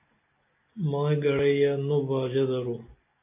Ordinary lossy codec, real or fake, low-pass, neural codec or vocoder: MP3, 24 kbps; real; 3.6 kHz; none